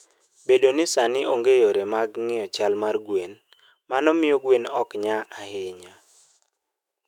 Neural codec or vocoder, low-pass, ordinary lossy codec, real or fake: autoencoder, 48 kHz, 128 numbers a frame, DAC-VAE, trained on Japanese speech; 19.8 kHz; none; fake